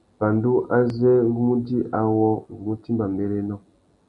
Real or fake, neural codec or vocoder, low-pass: real; none; 10.8 kHz